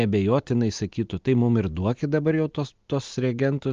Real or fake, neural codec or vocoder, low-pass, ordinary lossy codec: real; none; 7.2 kHz; Opus, 32 kbps